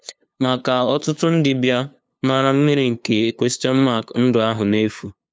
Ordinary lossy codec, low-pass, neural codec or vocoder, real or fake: none; none; codec, 16 kHz, 2 kbps, FunCodec, trained on LibriTTS, 25 frames a second; fake